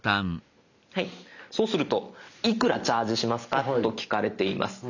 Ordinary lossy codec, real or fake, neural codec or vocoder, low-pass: none; real; none; 7.2 kHz